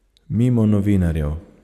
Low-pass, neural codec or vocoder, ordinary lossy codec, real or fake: 14.4 kHz; none; none; real